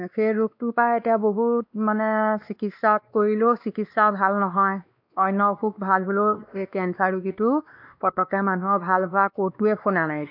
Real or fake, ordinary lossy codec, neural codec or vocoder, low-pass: fake; AAC, 48 kbps; codec, 16 kHz, 2 kbps, X-Codec, WavLM features, trained on Multilingual LibriSpeech; 5.4 kHz